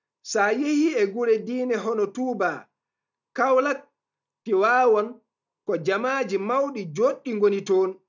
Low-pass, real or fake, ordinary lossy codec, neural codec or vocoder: 7.2 kHz; real; none; none